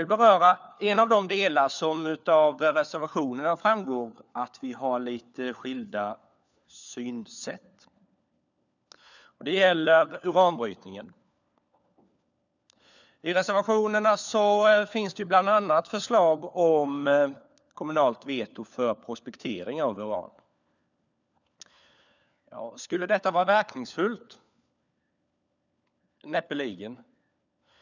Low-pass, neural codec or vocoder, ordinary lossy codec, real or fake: 7.2 kHz; codec, 16 kHz, 4 kbps, FunCodec, trained on LibriTTS, 50 frames a second; none; fake